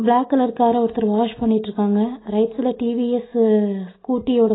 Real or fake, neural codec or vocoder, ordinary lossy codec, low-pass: real; none; AAC, 16 kbps; 7.2 kHz